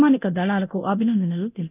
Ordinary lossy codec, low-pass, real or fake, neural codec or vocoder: none; 3.6 kHz; fake; codec, 24 kHz, 0.9 kbps, DualCodec